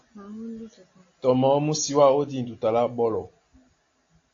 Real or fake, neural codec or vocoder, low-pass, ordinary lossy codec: real; none; 7.2 kHz; AAC, 48 kbps